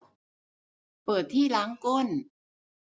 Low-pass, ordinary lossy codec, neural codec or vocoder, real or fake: none; none; none; real